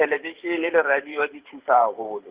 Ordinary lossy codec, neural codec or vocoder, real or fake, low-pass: Opus, 32 kbps; vocoder, 44.1 kHz, 128 mel bands every 512 samples, BigVGAN v2; fake; 3.6 kHz